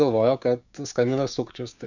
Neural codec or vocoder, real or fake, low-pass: codec, 16 kHz, 6 kbps, DAC; fake; 7.2 kHz